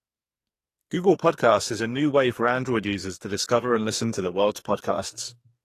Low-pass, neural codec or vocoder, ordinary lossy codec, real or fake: 14.4 kHz; codec, 44.1 kHz, 2.6 kbps, SNAC; AAC, 48 kbps; fake